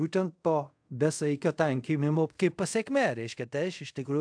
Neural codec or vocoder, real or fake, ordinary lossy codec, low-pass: codec, 24 kHz, 0.5 kbps, DualCodec; fake; AAC, 64 kbps; 9.9 kHz